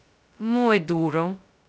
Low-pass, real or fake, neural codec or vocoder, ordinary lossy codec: none; fake; codec, 16 kHz, 0.2 kbps, FocalCodec; none